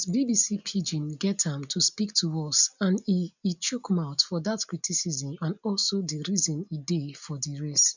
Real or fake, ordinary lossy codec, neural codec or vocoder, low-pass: real; none; none; 7.2 kHz